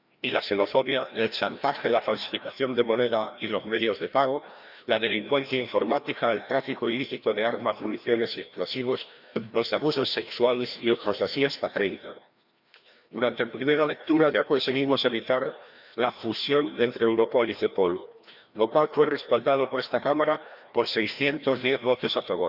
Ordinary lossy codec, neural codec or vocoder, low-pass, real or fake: Opus, 64 kbps; codec, 16 kHz, 1 kbps, FreqCodec, larger model; 5.4 kHz; fake